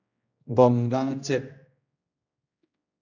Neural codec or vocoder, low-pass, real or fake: codec, 16 kHz, 1 kbps, X-Codec, HuBERT features, trained on general audio; 7.2 kHz; fake